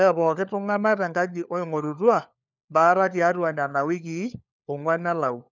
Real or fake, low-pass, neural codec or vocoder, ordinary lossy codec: fake; 7.2 kHz; codec, 16 kHz, 4 kbps, FunCodec, trained on LibriTTS, 50 frames a second; none